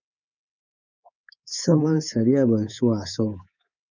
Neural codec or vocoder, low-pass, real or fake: codec, 24 kHz, 3.1 kbps, DualCodec; 7.2 kHz; fake